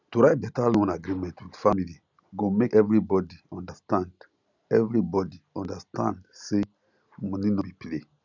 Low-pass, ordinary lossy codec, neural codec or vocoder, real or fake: 7.2 kHz; none; none; real